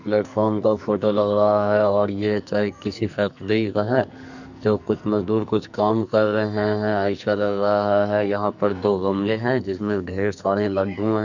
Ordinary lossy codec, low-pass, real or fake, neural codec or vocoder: none; 7.2 kHz; fake; codec, 44.1 kHz, 2.6 kbps, SNAC